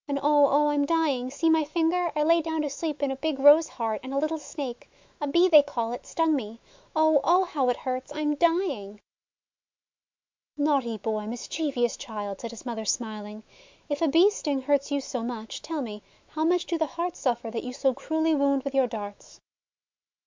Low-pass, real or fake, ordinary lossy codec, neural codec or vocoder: 7.2 kHz; fake; MP3, 64 kbps; autoencoder, 48 kHz, 128 numbers a frame, DAC-VAE, trained on Japanese speech